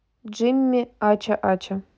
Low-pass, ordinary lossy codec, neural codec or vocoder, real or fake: none; none; none; real